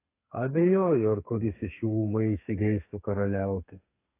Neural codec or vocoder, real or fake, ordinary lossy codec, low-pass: codec, 32 kHz, 1.9 kbps, SNAC; fake; AAC, 24 kbps; 3.6 kHz